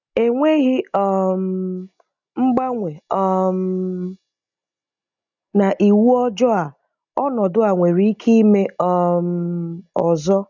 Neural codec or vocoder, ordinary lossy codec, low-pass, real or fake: none; none; 7.2 kHz; real